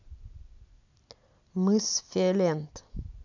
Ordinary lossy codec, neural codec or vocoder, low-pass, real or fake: none; none; 7.2 kHz; real